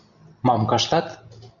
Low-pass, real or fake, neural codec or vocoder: 7.2 kHz; real; none